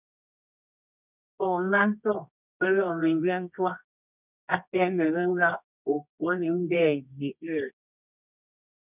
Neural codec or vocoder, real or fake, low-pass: codec, 24 kHz, 0.9 kbps, WavTokenizer, medium music audio release; fake; 3.6 kHz